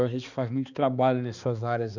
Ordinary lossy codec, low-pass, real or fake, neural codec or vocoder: none; 7.2 kHz; fake; codec, 16 kHz, 2 kbps, X-Codec, HuBERT features, trained on balanced general audio